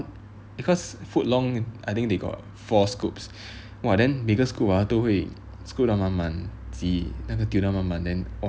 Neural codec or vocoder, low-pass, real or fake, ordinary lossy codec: none; none; real; none